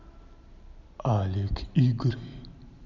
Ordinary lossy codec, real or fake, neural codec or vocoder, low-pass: none; real; none; 7.2 kHz